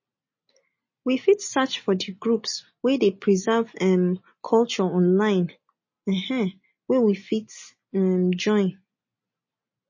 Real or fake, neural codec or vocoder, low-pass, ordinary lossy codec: real; none; 7.2 kHz; MP3, 32 kbps